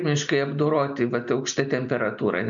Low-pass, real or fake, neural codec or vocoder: 7.2 kHz; real; none